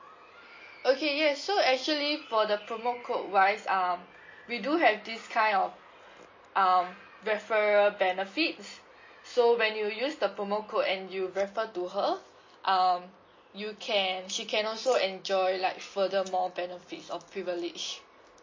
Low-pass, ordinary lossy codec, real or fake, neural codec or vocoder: 7.2 kHz; MP3, 32 kbps; real; none